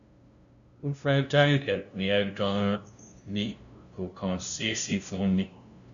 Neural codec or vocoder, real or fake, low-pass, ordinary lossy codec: codec, 16 kHz, 0.5 kbps, FunCodec, trained on LibriTTS, 25 frames a second; fake; 7.2 kHz; none